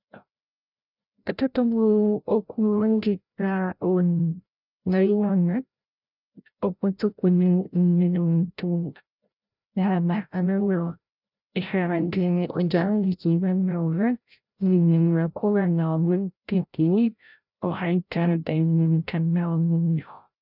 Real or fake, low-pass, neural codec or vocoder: fake; 5.4 kHz; codec, 16 kHz, 0.5 kbps, FreqCodec, larger model